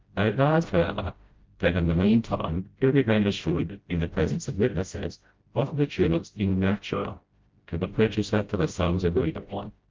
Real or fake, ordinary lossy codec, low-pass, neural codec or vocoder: fake; Opus, 32 kbps; 7.2 kHz; codec, 16 kHz, 0.5 kbps, FreqCodec, smaller model